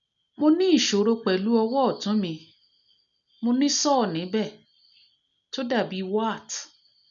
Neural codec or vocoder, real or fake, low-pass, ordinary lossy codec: none; real; 7.2 kHz; none